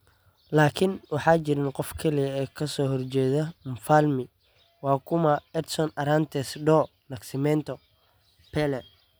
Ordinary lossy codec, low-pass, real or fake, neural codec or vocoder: none; none; real; none